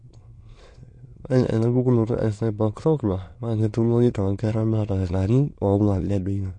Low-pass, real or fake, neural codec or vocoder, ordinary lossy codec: 9.9 kHz; fake; autoencoder, 22.05 kHz, a latent of 192 numbers a frame, VITS, trained on many speakers; MP3, 48 kbps